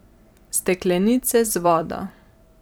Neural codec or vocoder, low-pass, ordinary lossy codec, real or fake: vocoder, 44.1 kHz, 128 mel bands every 256 samples, BigVGAN v2; none; none; fake